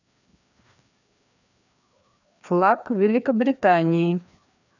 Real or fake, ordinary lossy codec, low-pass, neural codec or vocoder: fake; none; 7.2 kHz; codec, 16 kHz, 2 kbps, FreqCodec, larger model